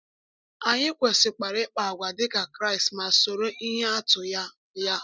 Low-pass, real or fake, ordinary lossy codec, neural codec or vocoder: none; real; none; none